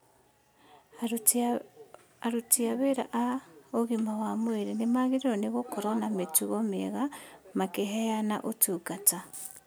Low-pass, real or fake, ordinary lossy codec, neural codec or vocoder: none; real; none; none